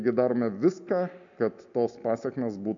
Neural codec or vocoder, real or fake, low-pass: none; real; 7.2 kHz